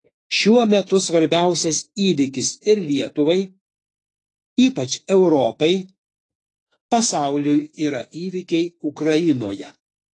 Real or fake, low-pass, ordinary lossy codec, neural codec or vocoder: fake; 10.8 kHz; AAC, 32 kbps; autoencoder, 48 kHz, 32 numbers a frame, DAC-VAE, trained on Japanese speech